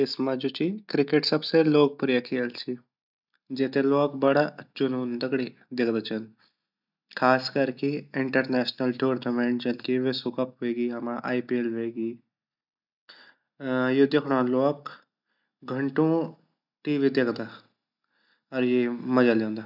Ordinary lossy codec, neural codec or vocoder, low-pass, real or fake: none; none; 5.4 kHz; real